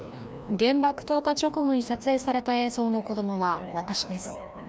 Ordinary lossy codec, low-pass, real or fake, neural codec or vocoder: none; none; fake; codec, 16 kHz, 1 kbps, FreqCodec, larger model